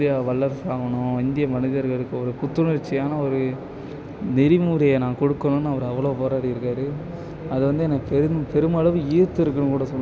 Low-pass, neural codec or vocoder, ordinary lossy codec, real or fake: none; none; none; real